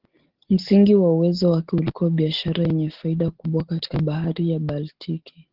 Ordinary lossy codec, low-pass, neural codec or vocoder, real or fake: Opus, 16 kbps; 5.4 kHz; none; real